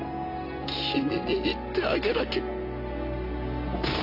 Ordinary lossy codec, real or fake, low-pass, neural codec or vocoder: AAC, 32 kbps; real; 5.4 kHz; none